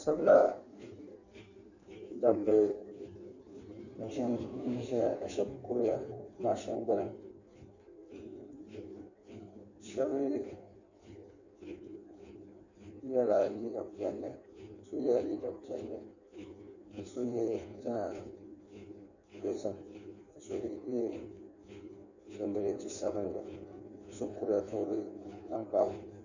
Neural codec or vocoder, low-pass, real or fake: codec, 16 kHz in and 24 kHz out, 1.1 kbps, FireRedTTS-2 codec; 7.2 kHz; fake